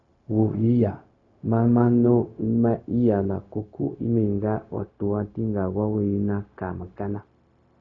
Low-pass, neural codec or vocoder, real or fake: 7.2 kHz; codec, 16 kHz, 0.4 kbps, LongCat-Audio-Codec; fake